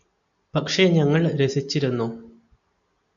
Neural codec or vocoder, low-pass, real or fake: none; 7.2 kHz; real